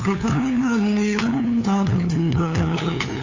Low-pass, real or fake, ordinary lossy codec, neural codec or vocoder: 7.2 kHz; fake; AAC, 48 kbps; codec, 16 kHz, 2 kbps, FunCodec, trained on LibriTTS, 25 frames a second